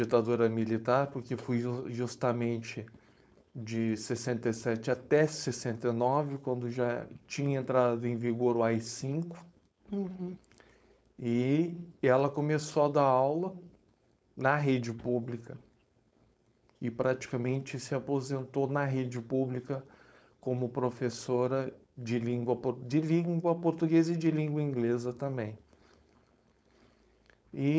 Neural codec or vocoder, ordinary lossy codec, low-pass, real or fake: codec, 16 kHz, 4.8 kbps, FACodec; none; none; fake